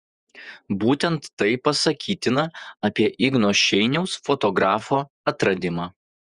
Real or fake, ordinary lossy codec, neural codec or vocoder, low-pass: fake; Opus, 64 kbps; vocoder, 48 kHz, 128 mel bands, Vocos; 10.8 kHz